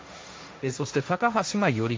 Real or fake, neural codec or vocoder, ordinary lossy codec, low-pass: fake; codec, 16 kHz, 1.1 kbps, Voila-Tokenizer; none; none